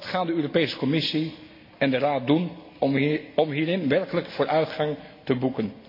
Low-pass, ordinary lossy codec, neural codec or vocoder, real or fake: 5.4 kHz; MP3, 32 kbps; none; real